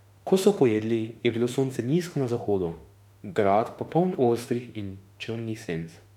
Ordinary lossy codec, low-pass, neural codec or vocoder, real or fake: none; 19.8 kHz; autoencoder, 48 kHz, 32 numbers a frame, DAC-VAE, trained on Japanese speech; fake